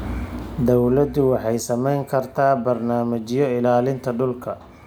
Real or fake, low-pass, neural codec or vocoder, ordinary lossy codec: real; none; none; none